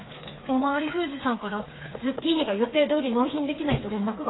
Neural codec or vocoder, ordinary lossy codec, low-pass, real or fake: codec, 16 kHz, 4 kbps, FreqCodec, smaller model; AAC, 16 kbps; 7.2 kHz; fake